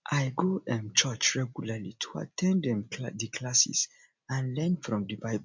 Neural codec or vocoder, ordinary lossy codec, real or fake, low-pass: none; MP3, 64 kbps; real; 7.2 kHz